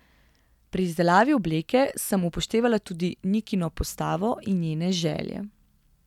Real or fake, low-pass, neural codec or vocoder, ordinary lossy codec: real; 19.8 kHz; none; none